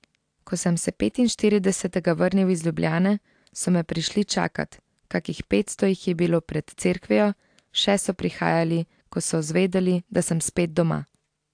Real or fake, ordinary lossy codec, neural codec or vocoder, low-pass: real; AAC, 64 kbps; none; 9.9 kHz